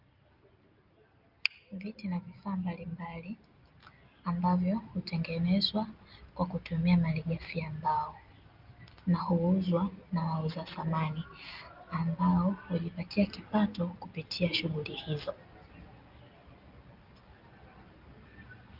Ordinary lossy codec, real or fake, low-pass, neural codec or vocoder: Opus, 24 kbps; real; 5.4 kHz; none